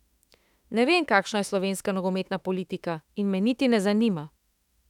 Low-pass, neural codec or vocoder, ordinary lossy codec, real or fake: 19.8 kHz; autoencoder, 48 kHz, 32 numbers a frame, DAC-VAE, trained on Japanese speech; none; fake